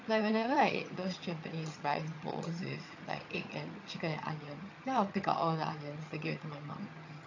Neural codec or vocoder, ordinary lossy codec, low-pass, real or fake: vocoder, 22.05 kHz, 80 mel bands, HiFi-GAN; AAC, 48 kbps; 7.2 kHz; fake